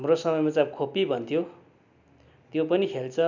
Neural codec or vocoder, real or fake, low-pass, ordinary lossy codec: none; real; 7.2 kHz; none